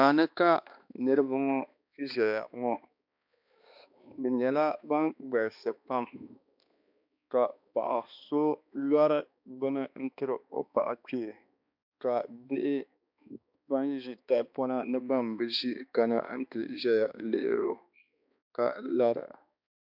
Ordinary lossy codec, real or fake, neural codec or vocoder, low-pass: MP3, 48 kbps; fake; codec, 16 kHz, 2 kbps, X-Codec, HuBERT features, trained on balanced general audio; 5.4 kHz